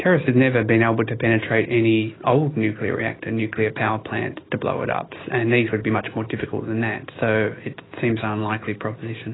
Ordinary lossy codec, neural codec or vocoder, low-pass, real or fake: AAC, 16 kbps; none; 7.2 kHz; real